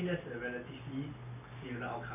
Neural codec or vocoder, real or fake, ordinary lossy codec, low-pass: none; real; none; 3.6 kHz